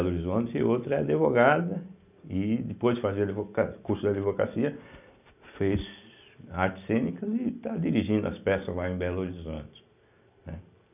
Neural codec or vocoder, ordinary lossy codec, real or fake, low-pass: none; none; real; 3.6 kHz